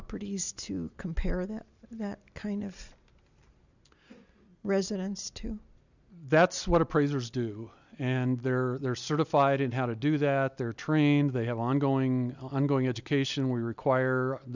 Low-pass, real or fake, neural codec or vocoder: 7.2 kHz; real; none